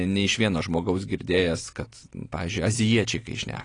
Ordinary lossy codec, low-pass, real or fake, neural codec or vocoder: AAC, 32 kbps; 9.9 kHz; real; none